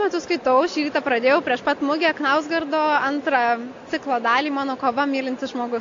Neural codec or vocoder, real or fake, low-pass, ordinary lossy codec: none; real; 7.2 kHz; AAC, 48 kbps